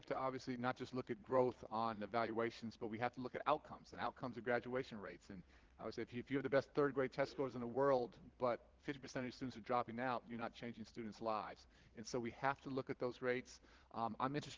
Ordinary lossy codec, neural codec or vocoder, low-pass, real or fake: Opus, 16 kbps; vocoder, 22.05 kHz, 80 mel bands, Vocos; 7.2 kHz; fake